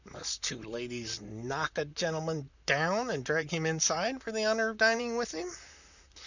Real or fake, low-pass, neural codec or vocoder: fake; 7.2 kHz; vocoder, 44.1 kHz, 128 mel bands, Pupu-Vocoder